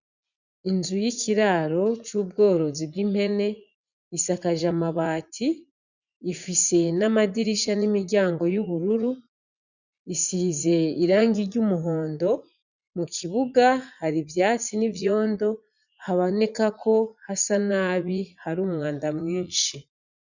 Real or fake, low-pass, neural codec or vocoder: fake; 7.2 kHz; vocoder, 44.1 kHz, 80 mel bands, Vocos